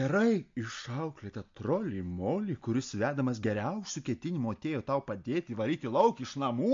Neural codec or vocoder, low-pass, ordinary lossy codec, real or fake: none; 7.2 kHz; MP3, 48 kbps; real